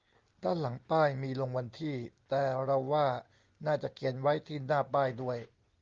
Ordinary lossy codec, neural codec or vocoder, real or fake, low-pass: Opus, 32 kbps; none; real; 7.2 kHz